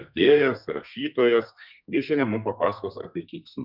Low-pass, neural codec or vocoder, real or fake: 5.4 kHz; codec, 32 kHz, 1.9 kbps, SNAC; fake